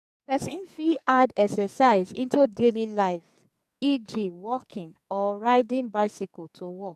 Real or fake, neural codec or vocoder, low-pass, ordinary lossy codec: fake; codec, 44.1 kHz, 2.6 kbps, SNAC; 14.4 kHz; none